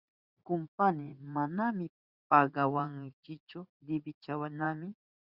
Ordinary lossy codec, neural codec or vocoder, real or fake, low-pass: Opus, 64 kbps; vocoder, 44.1 kHz, 80 mel bands, Vocos; fake; 5.4 kHz